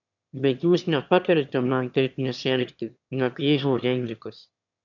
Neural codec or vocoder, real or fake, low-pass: autoencoder, 22.05 kHz, a latent of 192 numbers a frame, VITS, trained on one speaker; fake; 7.2 kHz